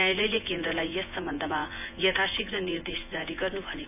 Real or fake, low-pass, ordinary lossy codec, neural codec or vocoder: fake; 3.6 kHz; none; vocoder, 24 kHz, 100 mel bands, Vocos